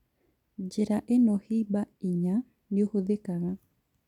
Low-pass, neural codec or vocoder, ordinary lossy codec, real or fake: 19.8 kHz; none; none; real